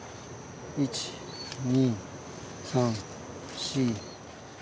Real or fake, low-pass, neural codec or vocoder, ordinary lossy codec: real; none; none; none